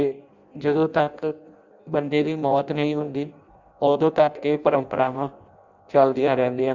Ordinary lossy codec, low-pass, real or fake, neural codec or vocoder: Opus, 64 kbps; 7.2 kHz; fake; codec, 16 kHz in and 24 kHz out, 0.6 kbps, FireRedTTS-2 codec